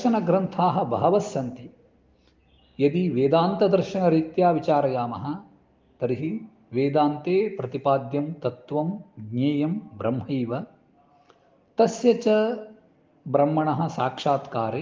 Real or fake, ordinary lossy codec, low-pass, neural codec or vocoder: real; Opus, 32 kbps; 7.2 kHz; none